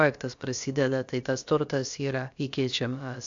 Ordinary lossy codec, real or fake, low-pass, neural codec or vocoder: AAC, 64 kbps; fake; 7.2 kHz; codec, 16 kHz, about 1 kbps, DyCAST, with the encoder's durations